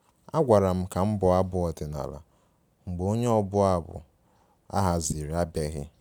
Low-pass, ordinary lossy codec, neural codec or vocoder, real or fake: none; none; none; real